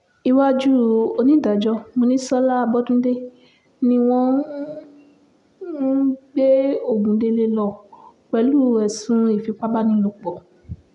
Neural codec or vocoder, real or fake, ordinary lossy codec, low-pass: none; real; MP3, 96 kbps; 10.8 kHz